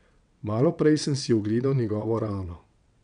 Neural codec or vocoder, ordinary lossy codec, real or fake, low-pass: vocoder, 22.05 kHz, 80 mel bands, WaveNeXt; none; fake; 9.9 kHz